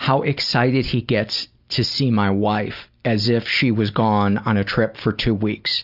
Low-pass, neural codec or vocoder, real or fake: 5.4 kHz; none; real